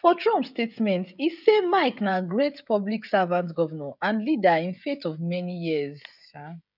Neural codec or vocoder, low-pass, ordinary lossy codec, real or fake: codec, 16 kHz, 16 kbps, FreqCodec, smaller model; 5.4 kHz; none; fake